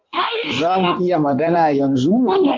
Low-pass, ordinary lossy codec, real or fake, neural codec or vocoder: 7.2 kHz; Opus, 32 kbps; fake; codec, 16 kHz in and 24 kHz out, 1.1 kbps, FireRedTTS-2 codec